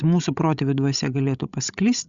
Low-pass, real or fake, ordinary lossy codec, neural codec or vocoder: 7.2 kHz; fake; Opus, 64 kbps; codec, 16 kHz, 16 kbps, FreqCodec, larger model